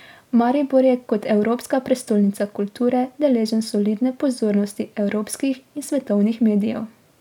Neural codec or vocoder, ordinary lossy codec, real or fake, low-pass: none; none; real; 19.8 kHz